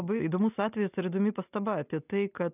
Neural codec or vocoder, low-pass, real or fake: none; 3.6 kHz; real